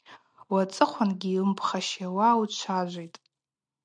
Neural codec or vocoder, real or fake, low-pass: none; real; 9.9 kHz